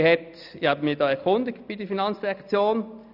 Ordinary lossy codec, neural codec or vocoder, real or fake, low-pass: none; none; real; 5.4 kHz